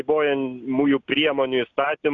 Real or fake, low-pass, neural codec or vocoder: real; 7.2 kHz; none